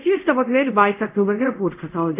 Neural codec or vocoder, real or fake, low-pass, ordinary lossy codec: codec, 24 kHz, 0.5 kbps, DualCodec; fake; 3.6 kHz; AAC, 32 kbps